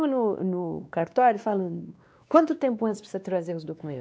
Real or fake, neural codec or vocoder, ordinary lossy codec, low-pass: fake; codec, 16 kHz, 2 kbps, X-Codec, WavLM features, trained on Multilingual LibriSpeech; none; none